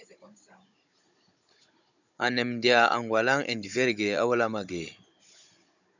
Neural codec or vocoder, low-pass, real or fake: codec, 16 kHz, 16 kbps, FunCodec, trained on Chinese and English, 50 frames a second; 7.2 kHz; fake